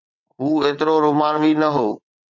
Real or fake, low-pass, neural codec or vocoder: fake; 7.2 kHz; vocoder, 22.05 kHz, 80 mel bands, WaveNeXt